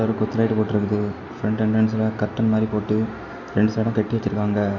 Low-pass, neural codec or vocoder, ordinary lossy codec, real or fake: 7.2 kHz; none; none; real